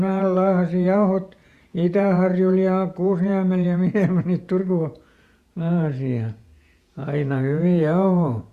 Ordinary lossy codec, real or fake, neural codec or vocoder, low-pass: Opus, 64 kbps; fake; vocoder, 48 kHz, 128 mel bands, Vocos; 14.4 kHz